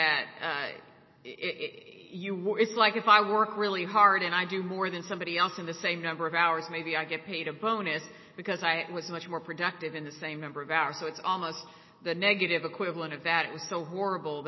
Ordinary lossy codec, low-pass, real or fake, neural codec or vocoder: MP3, 24 kbps; 7.2 kHz; real; none